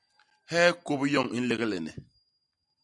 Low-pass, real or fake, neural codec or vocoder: 10.8 kHz; real; none